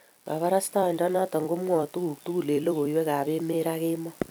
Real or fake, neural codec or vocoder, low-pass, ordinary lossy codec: fake; vocoder, 44.1 kHz, 128 mel bands every 256 samples, BigVGAN v2; none; none